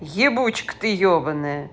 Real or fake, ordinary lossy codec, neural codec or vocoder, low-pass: real; none; none; none